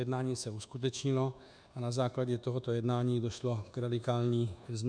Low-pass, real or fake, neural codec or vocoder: 9.9 kHz; fake; codec, 24 kHz, 1.2 kbps, DualCodec